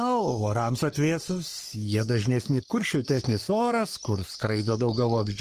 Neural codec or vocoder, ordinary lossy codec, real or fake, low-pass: codec, 44.1 kHz, 3.4 kbps, Pupu-Codec; Opus, 24 kbps; fake; 14.4 kHz